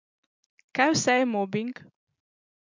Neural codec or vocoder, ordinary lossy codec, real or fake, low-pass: none; MP3, 64 kbps; real; 7.2 kHz